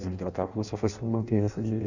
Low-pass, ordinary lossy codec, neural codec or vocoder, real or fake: 7.2 kHz; none; codec, 16 kHz in and 24 kHz out, 0.6 kbps, FireRedTTS-2 codec; fake